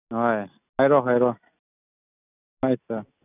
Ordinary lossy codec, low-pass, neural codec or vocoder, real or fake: none; 3.6 kHz; none; real